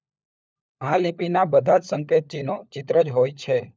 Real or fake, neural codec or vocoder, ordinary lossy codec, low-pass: fake; codec, 16 kHz, 4 kbps, FunCodec, trained on LibriTTS, 50 frames a second; none; none